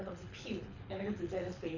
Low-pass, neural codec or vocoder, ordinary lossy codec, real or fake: 7.2 kHz; codec, 24 kHz, 6 kbps, HILCodec; none; fake